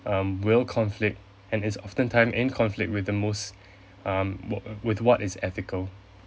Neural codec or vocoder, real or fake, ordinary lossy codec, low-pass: none; real; none; none